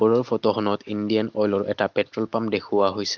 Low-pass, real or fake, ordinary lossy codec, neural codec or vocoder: 7.2 kHz; real; Opus, 32 kbps; none